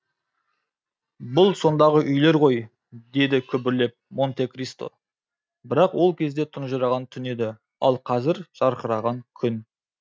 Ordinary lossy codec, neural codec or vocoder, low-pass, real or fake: none; none; none; real